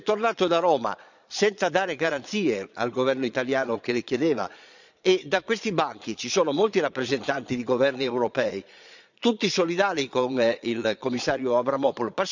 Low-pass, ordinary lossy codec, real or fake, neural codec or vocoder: 7.2 kHz; none; fake; vocoder, 22.05 kHz, 80 mel bands, Vocos